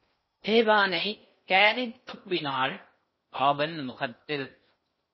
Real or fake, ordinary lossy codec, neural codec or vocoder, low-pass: fake; MP3, 24 kbps; codec, 16 kHz in and 24 kHz out, 0.6 kbps, FocalCodec, streaming, 2048 codes; 7.2 kHz